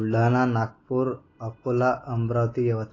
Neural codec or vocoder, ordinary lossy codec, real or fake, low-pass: none; AAC, 32 kbps; real; 7.2 kHz